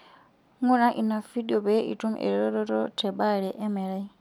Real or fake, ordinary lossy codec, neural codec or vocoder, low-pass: real; none; none; 19.8 kHz